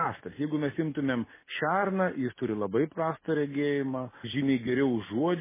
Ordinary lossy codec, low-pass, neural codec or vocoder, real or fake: MP3, 16 kbps; 3.6 kHz; none; real